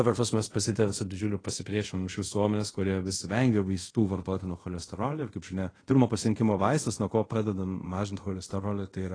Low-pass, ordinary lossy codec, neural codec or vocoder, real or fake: 9.9 kHz; AAC, 32 kbps; codec, 24 kHz, 0.5 kbps, DualCodec; fake